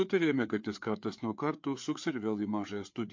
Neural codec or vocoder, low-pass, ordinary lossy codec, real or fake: codec, 16 kHz, 4 kbps, FreqCodec, larger model; 7.2 kHz; MP3, 48 kbps; fake